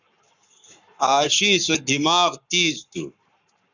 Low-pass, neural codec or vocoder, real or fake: 7.2 kHz; codec, 44.1 kHz, 7.8 kbps, Pupu-Codec; fake